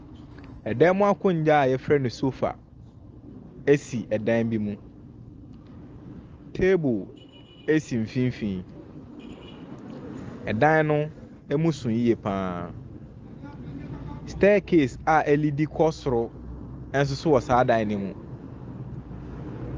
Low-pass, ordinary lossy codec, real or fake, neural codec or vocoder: 7.2 kHz; Opus, 24 kbps; real; none